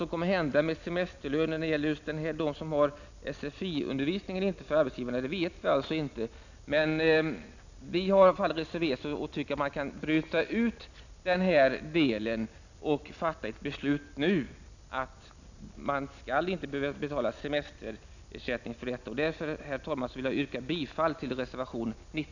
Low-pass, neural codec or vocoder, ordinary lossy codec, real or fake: 7.2 kHz; none; none; real